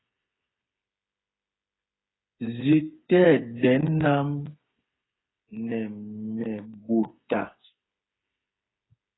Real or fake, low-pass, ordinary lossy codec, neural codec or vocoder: fake; 7.2 kHz; AAC, 16 kbps; codec, 16 kHz, 8 kbps, FreqCodec, smaller model